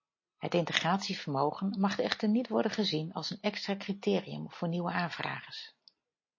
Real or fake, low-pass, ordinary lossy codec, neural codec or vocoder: real; 7.2 kHz; MP3, 32 kbps; none